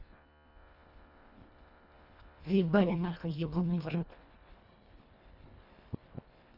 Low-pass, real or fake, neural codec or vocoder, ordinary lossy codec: 5.4 kHz; fake; codec, 24 kHz, 1.5 kbps, HILCodec; none